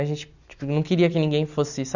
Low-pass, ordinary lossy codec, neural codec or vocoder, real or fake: 7.2 kHz; none; none; real